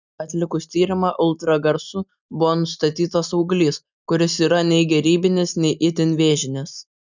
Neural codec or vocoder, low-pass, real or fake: none; 7.2 kHz; real